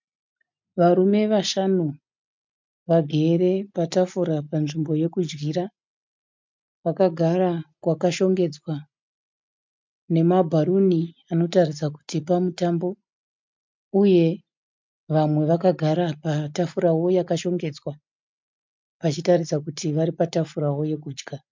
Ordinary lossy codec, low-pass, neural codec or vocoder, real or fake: MP3, 64 kbps; 7.2 kHz; none; real